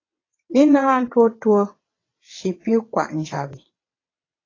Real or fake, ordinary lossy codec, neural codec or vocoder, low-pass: fake; AAC, 32 kbps; vocoder, 22.05 kHz, 80 mel bands, WaveNeXt; 7.2 kHz